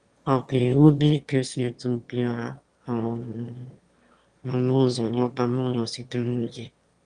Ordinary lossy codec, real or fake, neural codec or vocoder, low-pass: Opus, 32 kbps; fake; autoencoder, 22.05 kHz, a latent of 192 numbers a frame, VITS, trained on one speaker; 9.9 kHz